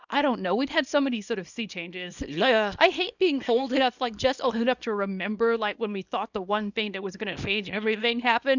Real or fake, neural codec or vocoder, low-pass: fake; codec, 24 kHz, 0.9 kbps, WavTokenizer, small release; 7.2 kHz